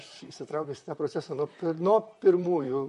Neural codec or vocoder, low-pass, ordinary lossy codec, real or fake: vocoder, 44.1 kHz, 128 mel bands, Pupu-Vocoder; 14.4 kHz; MP3, 48 kbps; fake